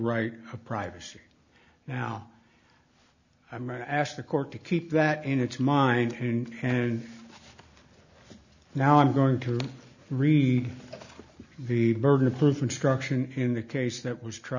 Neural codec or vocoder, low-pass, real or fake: none; 7.2 kHz; real